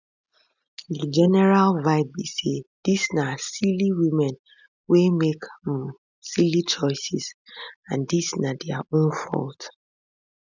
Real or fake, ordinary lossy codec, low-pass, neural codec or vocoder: real; none; 7.2 kHz; none